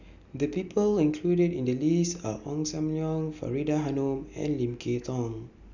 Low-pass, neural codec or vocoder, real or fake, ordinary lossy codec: 7.2 kHz; none; real; none